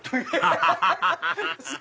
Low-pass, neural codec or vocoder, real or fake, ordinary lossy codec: none; none; real; none